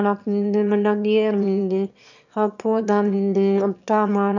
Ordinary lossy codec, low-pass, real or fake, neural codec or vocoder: none; 7.2 kHz; fake; autoencoder, 22.05 kHz, a latent of 192 numbers a frame, VITS, trained on one speaker